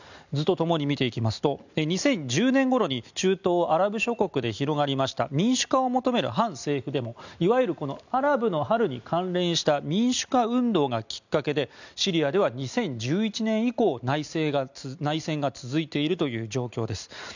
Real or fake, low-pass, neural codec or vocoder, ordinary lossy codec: real; 7.2 kHz; none; none